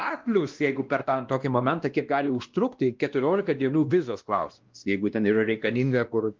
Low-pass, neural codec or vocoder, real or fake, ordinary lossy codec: 7.2 kHz; codec, 16 kHz, 1 kbps, X-Codec, WavLM features, trained on Multilingual LibriSpeech; fake; Opus, 32 kbps